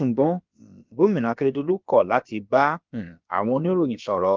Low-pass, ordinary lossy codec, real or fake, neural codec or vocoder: 7.2 kHz; Opus, 24 kbps; fake; codec, 16 kHz, about 1 kbps, DyCAST, with the encoder's durations